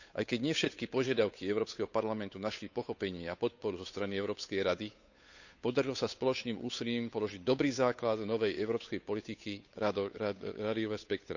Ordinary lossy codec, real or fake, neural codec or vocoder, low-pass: none; fake; codec, 16 kHz, 8 kbps, FunCodec, trained on Chinese and English, 25 frames a second; 7.2 kHz